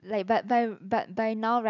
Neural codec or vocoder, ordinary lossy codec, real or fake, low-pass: none; none; real; 7.2 kHz